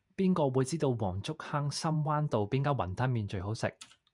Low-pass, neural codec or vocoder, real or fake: 10.8 kHz; vocoder, 48 kHz, 128 mel bands, Vocos; fake